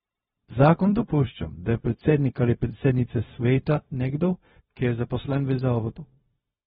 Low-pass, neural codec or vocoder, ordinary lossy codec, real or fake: 7.2 kHz; codec, 16 kHz, 0.4 kbps, LongCat-Audio-Codec; AAC, 16 kbps; fake